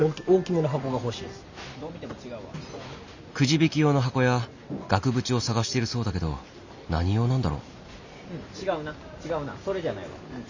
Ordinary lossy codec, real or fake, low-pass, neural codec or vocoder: Opus, 64 kbps; real; 7.2 kHz; none